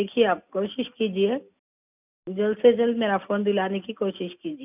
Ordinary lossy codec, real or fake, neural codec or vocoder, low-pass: none; real; none; 3.6 kHz